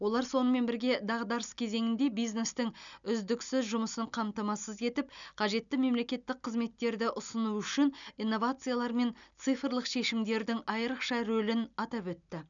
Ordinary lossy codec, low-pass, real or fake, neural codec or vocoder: none; 7.2 kHz; real; none